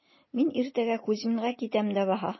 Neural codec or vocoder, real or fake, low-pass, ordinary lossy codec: none; real; 7.2 kHz; MP3, 24 kbps